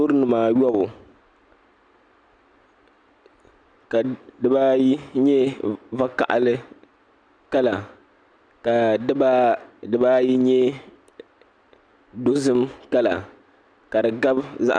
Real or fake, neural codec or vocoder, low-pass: real; none; 9.9 kHz